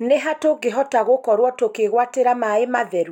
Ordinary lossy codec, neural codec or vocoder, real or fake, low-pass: none; none; real; 19.8 kHz